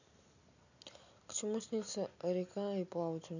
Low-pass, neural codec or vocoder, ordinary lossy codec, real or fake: 7.2 kHz; none; none; real